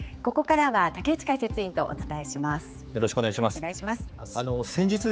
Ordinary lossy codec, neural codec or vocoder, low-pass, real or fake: none; codec, 16 kHz, 4 kbps, X-Codec, HuBERT features, trained on general audio; none; fake